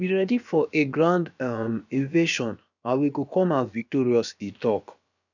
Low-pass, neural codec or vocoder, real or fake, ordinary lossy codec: 7.2 kHz; codec, 16 kHz, about 1 kbps, DyCAST, with the encoder's durations; fake; none